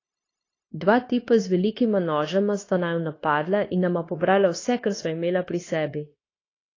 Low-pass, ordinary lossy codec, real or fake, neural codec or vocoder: 7.2 kHz; AAC, 32 kbps; fake; codec, 16 kHz, 0.9 kbps, LongCat-Audio-Codec